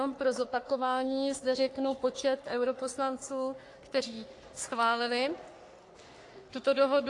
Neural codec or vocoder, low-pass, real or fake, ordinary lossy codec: codec, 44.1 kHz, 3.4 kbps, Pupu-Codec; 10.8 kHz; fake; AAC, 48 kbps